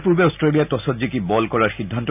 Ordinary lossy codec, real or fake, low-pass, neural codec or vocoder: none; real; 3.6 kHz; none